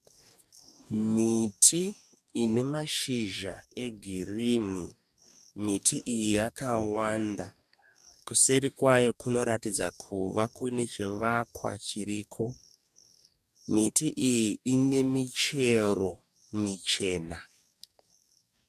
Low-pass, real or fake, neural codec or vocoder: 14.4 kHz; fake; codec, 44.1 kHz, 2.6 kbps, DAC